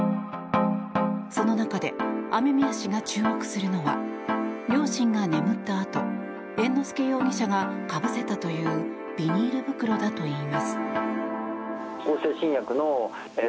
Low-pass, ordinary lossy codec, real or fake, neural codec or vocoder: none; none; real; none